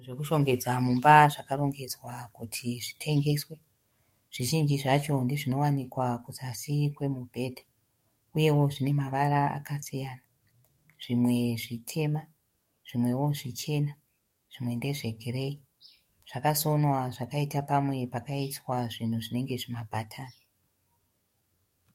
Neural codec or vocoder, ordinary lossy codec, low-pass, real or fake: codec, 44.1 kHz, 7.8 kbps, DAC; MP3, 64 kbps; 19.8 kHz; fake